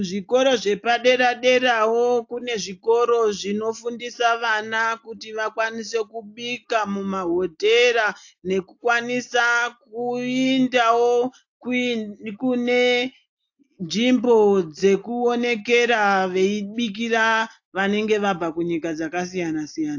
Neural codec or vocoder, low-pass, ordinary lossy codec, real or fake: none; 7.2 kHz; AAC, 48 kbps; real